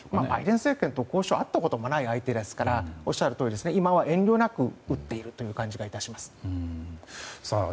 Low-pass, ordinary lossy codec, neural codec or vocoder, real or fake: none; none; none; real